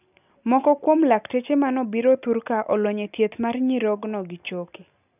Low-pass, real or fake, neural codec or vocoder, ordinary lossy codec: 3.6 kHz; real; none; none